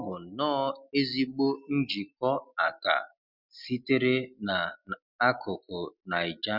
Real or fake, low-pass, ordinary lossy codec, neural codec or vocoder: real; 5.4 kHz; none; none